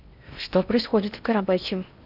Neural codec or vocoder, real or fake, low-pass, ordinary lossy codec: codec, 16 kHz in and 24 kHz out, 0.6 kbps, FocalCodec, streaming, 4096 codes; fake; 5.4 kHz; none